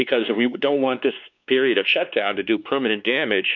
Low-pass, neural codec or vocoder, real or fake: 7.2 kHz; codec, 16 kHz, 2 kbps, X-Codec, WavLM features, trained on Multilingual LibriSpeech; fake